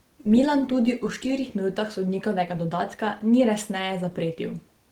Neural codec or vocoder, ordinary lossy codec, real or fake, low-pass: none; Opus, 16 kbps; real; 19.8 kHz